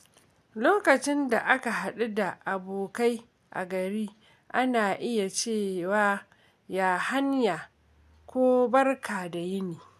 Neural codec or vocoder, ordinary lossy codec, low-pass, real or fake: none; none; 14.4 kHz; real